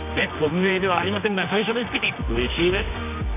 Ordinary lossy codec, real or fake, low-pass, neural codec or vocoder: none; fake; 3.6 kHz; codec, 24 kHz, 0.9 kbps, WavTokenizer, medium music audio release